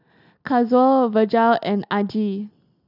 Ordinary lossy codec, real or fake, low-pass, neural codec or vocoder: none; real; 5.4 kHz; none